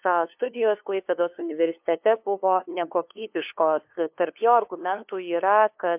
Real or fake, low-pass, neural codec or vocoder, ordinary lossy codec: fake; 3.6 kHz; codec, 16 kHz, 2 kbps, FunCodec, trained on LibriTTS, 25 frames a second; MP3, 32 kbps